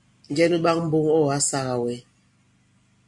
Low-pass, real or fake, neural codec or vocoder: 10.8 kHz; real; none